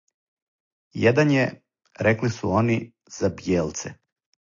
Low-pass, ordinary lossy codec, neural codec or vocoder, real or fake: 7.2 kHz; AAC, 48 kbps; none; real